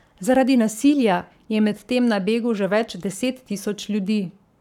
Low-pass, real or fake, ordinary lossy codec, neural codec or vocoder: 19.8 kHz; fake; none; codec, 44.1 kHz, 7.8 kbps, Pupu-Codec